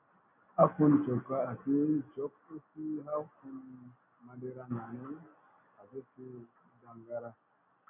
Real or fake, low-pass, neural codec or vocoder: real; 3.6 kHz; none